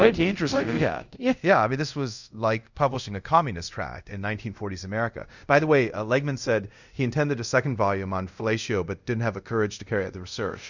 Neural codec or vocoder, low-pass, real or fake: codec, 24 kHz, 0.5 kbps, DualCodec; 7.2 kHz; fake